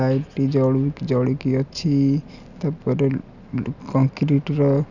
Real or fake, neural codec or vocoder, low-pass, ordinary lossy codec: real; none; 7.2 kHz; none